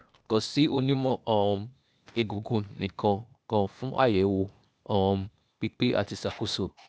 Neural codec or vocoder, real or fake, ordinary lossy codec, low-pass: codec, 16 kHz, 0.8 kbps, ZipCodec; fake; none; none